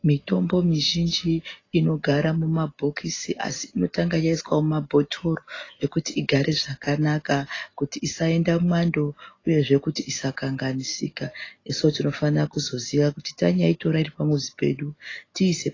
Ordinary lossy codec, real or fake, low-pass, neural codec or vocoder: AAC, 32 kbps; real; 7.2 kHz; none